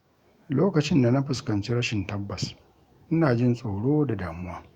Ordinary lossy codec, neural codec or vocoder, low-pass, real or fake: Opus, 64 kbps; autoencoder, 48 kHz, 128 numbers a frame, DAC-VAE, trained on Japanese speech; 19.8 kHz; fake